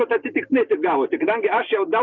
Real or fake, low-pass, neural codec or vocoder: real; 7.2 kHz; none